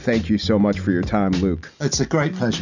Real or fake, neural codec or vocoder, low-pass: real; none; 7.2 kHz